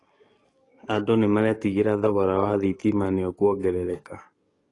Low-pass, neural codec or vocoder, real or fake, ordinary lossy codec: 10.8 kHz; codec, 44.1 kHz, 7.8 kbps, DAC; fake; AAC, 32 kbps